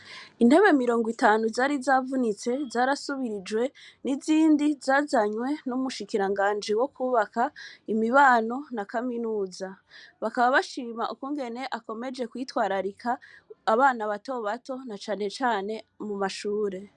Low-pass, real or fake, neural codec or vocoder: 10.8 kHz; real; none